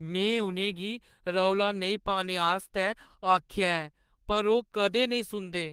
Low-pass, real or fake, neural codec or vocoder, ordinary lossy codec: 14.4 kHz; fake; codec, 32 kHz, 1.9 kbps, SNAC; Opus, 24 kbps